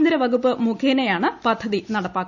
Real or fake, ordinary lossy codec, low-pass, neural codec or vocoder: real; none; 7.2 kHz; none